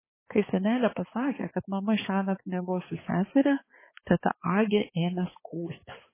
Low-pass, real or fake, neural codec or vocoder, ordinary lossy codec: 3.6 kHz; fake; codec, 16 kHz, 4 kbps, X-Codec, HuBERT features, trained on balanced general audio; MP3, 16 kbps